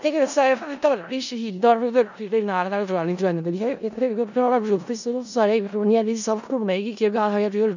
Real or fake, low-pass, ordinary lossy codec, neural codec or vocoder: fake; 7.2 kHz; none; codec, 16 kHz in and 24 kHz out, 0.4 kbps, LongCat-Audio-Codec, four codebook decoder